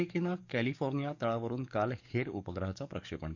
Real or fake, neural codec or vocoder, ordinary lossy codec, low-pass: fake; codec, 44.1 kHz, 7.8 kbps, DAC; none; 7.2 kHz